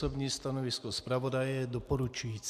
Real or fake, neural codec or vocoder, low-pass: real; none; 14.4 kHz